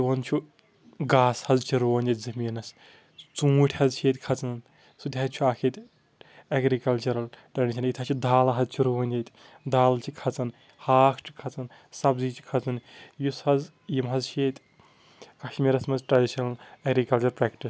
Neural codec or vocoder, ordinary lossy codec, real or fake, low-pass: none; none; real; none